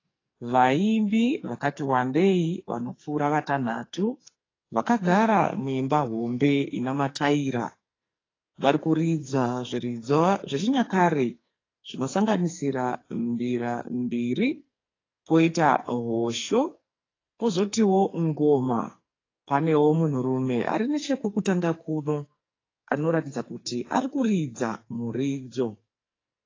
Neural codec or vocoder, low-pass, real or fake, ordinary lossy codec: codec, 44.1 kHz, 2.6 kbps, SNAC; 7.2 kHz; fake; AAC, 32 kbps